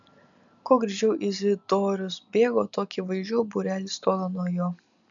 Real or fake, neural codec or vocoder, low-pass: real; none; 7.2 kHz